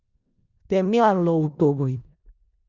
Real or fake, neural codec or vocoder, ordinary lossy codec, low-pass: fake; codec, 16 kHz in and 24 kHz out, 0.4 kbps, LongCat-Audio-Codec, four codebook decoder; Opus, 64 kbps; 7.2 kHz